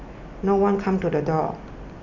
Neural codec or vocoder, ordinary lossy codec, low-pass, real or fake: none; none; 7.2 kHz; real